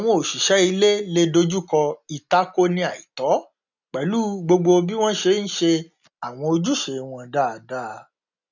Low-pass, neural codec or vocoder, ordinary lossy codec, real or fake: 7.2 kHz; none; none; real